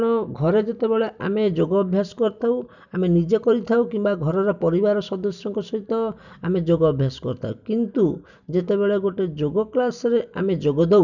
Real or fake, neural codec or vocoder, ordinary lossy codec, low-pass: real; none; none; 7.2 kHz